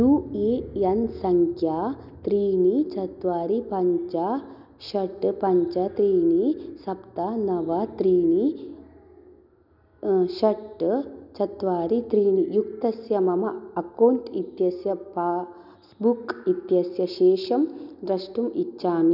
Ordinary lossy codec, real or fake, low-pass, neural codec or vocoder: none; real; 5.4 kHz; none